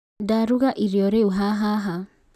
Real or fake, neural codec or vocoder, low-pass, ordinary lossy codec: real; none; 14.4 kHz; none